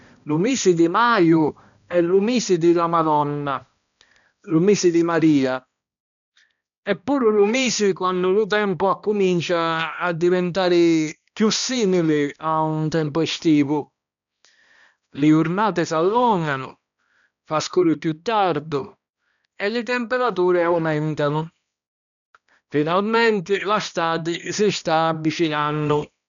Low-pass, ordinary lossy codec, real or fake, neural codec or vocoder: 7.2 kHz; none; fake; codec, 16 kHz, 1 kbps, X-Codec, HuBERT features, trained on balanced general audio